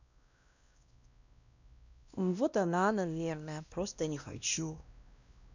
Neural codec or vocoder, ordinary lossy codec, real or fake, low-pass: codec, 16 kHz, 1 kbps, X-Codec, WavLM features, trained on Multilingual LibriSpeech; none; fake; 7.2 kHz